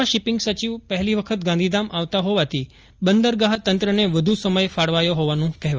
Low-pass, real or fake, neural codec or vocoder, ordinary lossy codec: 7.2 kHz; real; none; Opus, 24 kbps